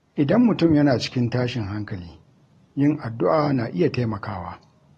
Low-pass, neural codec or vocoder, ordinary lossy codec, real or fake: 19.8 kHz; none; AAC, 32 kbps; real